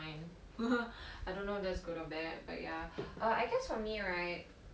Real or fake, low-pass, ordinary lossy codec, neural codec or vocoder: real; none; none; none